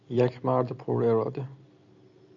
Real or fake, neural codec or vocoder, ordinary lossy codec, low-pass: real; none; MP3, 96 kbps; 7.2 kHz